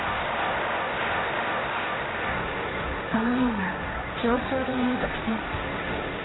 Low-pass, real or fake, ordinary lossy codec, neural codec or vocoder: 7.2 kHz; fake; AAC, 16 kbps; codec, 16 kHz, 1.1 kbps, Voila-Tokenizer